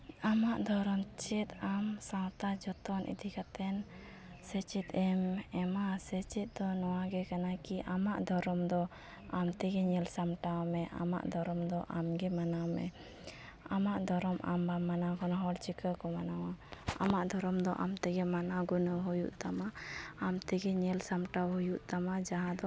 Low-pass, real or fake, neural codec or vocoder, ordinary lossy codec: none; real; none; none